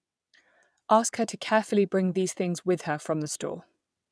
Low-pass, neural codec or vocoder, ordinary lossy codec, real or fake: none; vocoder, 22.05 kHz, 80 mel bands, WaveNeXt; none; fake